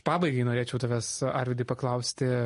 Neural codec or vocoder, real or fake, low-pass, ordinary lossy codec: none; real; 10.8 kHz; MP3, 48 kbps